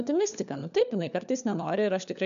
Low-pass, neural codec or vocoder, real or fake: 7.2 kHz; codec, 16 kHz, 4 kbps, FunCodec, trained on LibriTTS, 50 frames a second; fake